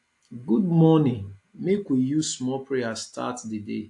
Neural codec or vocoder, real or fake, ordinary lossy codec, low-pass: none; real; AAC, 64 kbps; 10.8 kHz